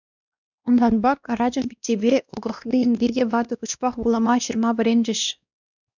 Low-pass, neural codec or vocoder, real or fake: 7.2 kHz; codec, 16 kHz, 1 kbps, X-Codec, WavLM features, trained on Multilingual LibriSpeech; fake